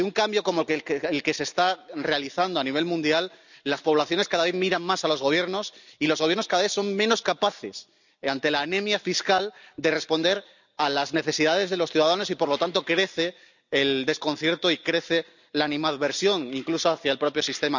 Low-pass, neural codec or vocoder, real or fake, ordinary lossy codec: 7.2 kHz; none; real; none